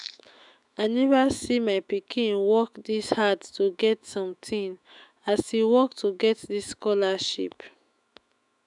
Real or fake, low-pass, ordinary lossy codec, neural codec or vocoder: fake; 10.8 kHz; none; autoencoder, 48 kHz, 128 numbers a frame, DAC-VAE, trained on Japanese speech